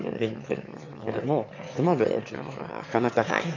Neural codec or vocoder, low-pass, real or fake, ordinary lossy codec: autoencoder, 22.05 kHz, a latent of 192 numbers a frame, VITS, trained on one speaker; 7.2 kHz; fake; MP3, 48 kbps